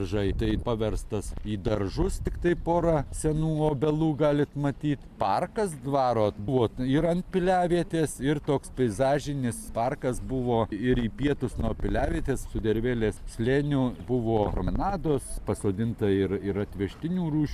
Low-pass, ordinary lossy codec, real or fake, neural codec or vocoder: 14.4 kHz; MP3, 96 kbps; real; none